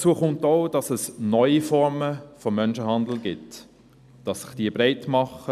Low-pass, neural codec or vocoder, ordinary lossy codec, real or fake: 14.4 kHz; none; none; real